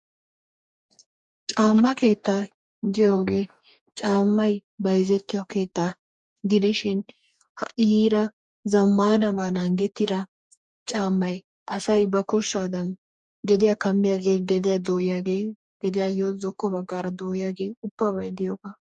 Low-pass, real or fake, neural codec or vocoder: 10.8 kHz; fake; codec, 44.1 kHz, 2.6 kbps, DAC